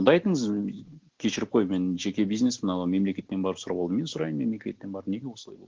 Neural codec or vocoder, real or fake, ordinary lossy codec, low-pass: none; real; Opus, 16 kbps; 7.2 kHz